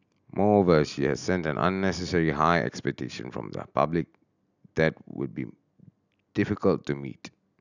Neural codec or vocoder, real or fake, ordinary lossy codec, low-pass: none; real; none; 7.2 kHz